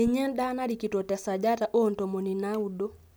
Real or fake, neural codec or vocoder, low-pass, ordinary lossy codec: real; none; none; none